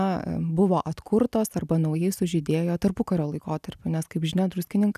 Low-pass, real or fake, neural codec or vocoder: 14.4 kHz; real; none